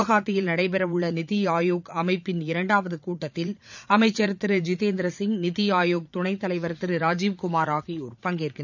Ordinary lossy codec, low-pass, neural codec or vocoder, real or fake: none; 7.2 kHz; vocoder, 44.1 kHz, 80 mel bands, Vocos; fake